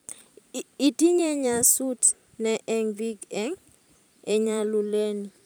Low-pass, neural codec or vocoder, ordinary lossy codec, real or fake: none; vocoder, 44.1 kHz, 128 mel bands every 512 samples, BigVGAN v2; none; fake